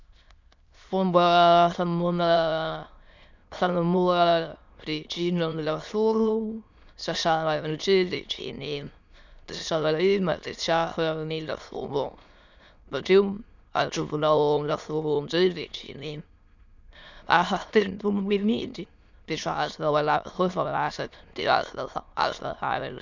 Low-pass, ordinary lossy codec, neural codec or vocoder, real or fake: 7.2 kHz; none; autoencoder, 22.05 kHz, a latent of 192 numbers a frame, VITS, trained on many speakers; fake